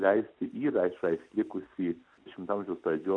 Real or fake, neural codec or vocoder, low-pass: real; none; 9.9 kHz